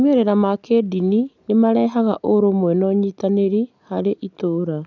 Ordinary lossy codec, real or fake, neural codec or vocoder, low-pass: none; real; none; 7.2 kHz